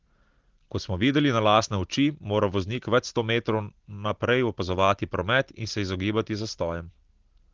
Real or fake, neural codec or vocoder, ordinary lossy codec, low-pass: real; none; Opus, 16 kbps; 7.2 kHz